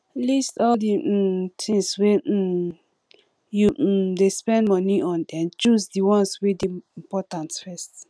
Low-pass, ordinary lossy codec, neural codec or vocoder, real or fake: none; none; none; real